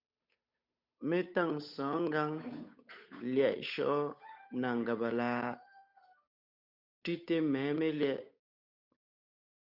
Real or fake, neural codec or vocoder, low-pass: fake; codec, 16 kHz, 8 kbps, FunCodec, trained on Chinese and English, 25 frames a second; 5.4 kHz